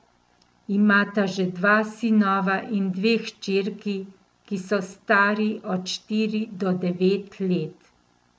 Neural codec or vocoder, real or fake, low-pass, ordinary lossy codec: none; real; none; none